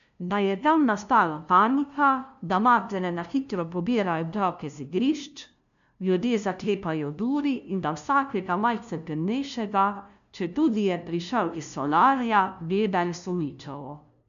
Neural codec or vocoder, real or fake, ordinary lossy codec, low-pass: codec, 16 kHz, 0.5 kbps, FunCodec, trained on LibriTTS, 25 frames a second; fake; none; 7.2 kHz